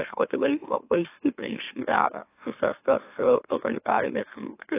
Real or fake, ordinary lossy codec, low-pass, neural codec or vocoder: fake; AAC, 24 kbps; 3.6 kHz; autoencoder, 44.1 kHz, a latent of 192 numbers a frame, MeloTTS